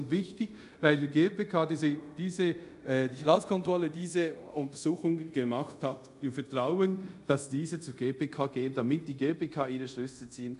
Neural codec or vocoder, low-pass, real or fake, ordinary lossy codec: codec, 24 kHz, 0.5 kbps, DualCodec; 10.8 kHz; fake; none